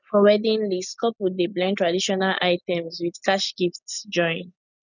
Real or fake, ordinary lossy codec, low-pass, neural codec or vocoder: real; none; 7.2 kHz; none